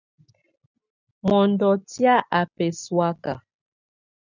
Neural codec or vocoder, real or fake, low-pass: none; real; 7.2 kHz